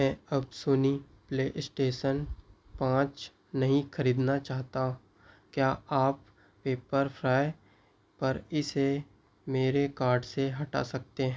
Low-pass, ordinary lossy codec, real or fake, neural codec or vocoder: none; none; real; none